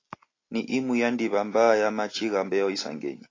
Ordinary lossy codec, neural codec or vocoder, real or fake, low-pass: AAC, 32 kbps; none; real; 7.2 kHz